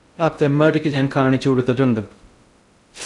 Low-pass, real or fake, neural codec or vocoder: 10.8 kHz; fake; codec, 16 kHz in and 24 kHz out, 0.6 kbps, FocalCodec, streaming, 2048 codes